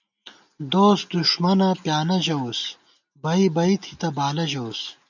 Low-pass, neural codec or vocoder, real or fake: 7.2 kHz; none; real